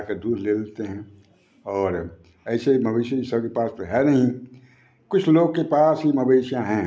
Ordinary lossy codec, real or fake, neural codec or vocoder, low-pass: none; real; none; none